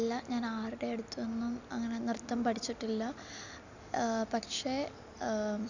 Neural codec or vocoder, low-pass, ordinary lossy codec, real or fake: none; 7.2 kHz; none; real